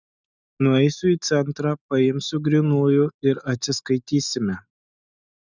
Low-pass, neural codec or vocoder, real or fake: 7.2 kHz; none; real